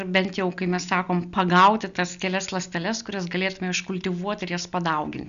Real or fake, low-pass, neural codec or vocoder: real; 7.2 kHz; none